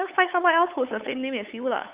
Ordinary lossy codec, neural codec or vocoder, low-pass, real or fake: Opus, 32 kbps; codec, 16 kHz, 8 kbps, FunCodec, trained on LibriTTS, 25 frames a second; 3.6 kHz; fake